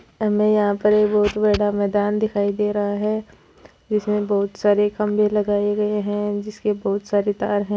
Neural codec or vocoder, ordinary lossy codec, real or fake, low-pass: none; none; real; none